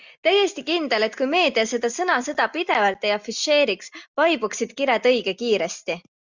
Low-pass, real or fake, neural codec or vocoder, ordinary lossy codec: 7.2 kHz; real; none; Opus, 64 kbps